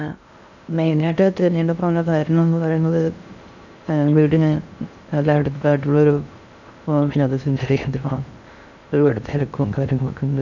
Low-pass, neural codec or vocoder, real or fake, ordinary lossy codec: 7.2 kHz; codec, 16 kHz in and 24 kHz out, 0.6 kbps, FocalCodec, streaming, 2048 codes; fake; none